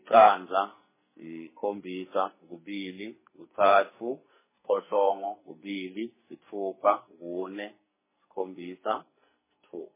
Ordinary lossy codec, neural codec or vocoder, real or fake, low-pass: MP3, 16 kbps; codec, 44.1 kHz, 2.6 kbps, SNAC; fake; 3.6 kHz